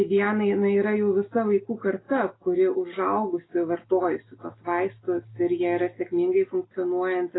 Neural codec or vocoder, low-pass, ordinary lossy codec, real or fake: none; 7.2 kHz; AAC, 16 kbps; real